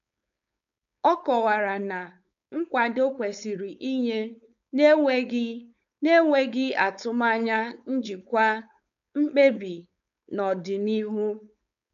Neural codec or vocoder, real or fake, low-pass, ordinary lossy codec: codec, 16 kHz, 4.8 kbps, FACodec; fake; 7.2 kHz; none